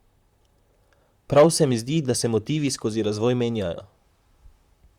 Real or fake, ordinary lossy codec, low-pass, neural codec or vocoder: fake; Opus, 64 kbps; 19.8 kHz; vocoder, 44.1 kHz, 128 mel bands, Pupu-Vocoder